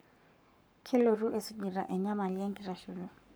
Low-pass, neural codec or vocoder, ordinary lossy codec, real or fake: none; codec, 44.1 kHz, 7.8 kbps, Pupu-Codec; none; fake